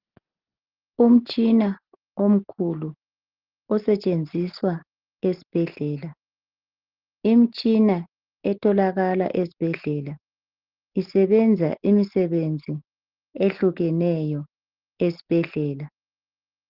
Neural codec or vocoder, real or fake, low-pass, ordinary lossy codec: none; real; 5.4 kHz; Opus, 16 kbps